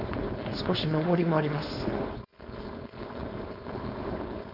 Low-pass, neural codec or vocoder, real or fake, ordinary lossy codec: 5.4 kHz; codec, 16 kHz, 4.8 kbps, FACodec; fake; none